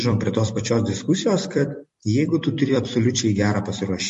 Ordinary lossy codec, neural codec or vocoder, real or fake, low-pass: MP3, 48 kbps; vocoder, 44.1 kHz, 128 mel bands every 256 samples, BigVGAN v2; fake; 14.4 kHz